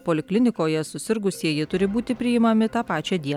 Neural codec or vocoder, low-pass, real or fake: none; 19.8 kHz; real